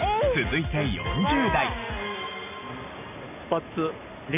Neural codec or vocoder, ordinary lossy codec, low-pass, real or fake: none; none; 3.6 kHz; real